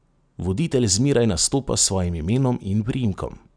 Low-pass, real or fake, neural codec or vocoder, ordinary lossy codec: 9.9 kHz; real; none; none